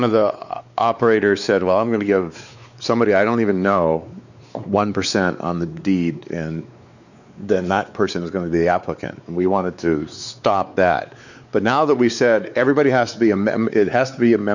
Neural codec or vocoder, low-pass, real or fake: codec, 16 kHz, 4 kbps, X-Codec, WavLM features, trained on Multilingual LibriSpeech; 7.2 kHz; fake